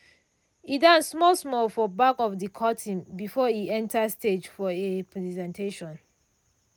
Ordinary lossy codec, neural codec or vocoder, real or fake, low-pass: none; none; real; none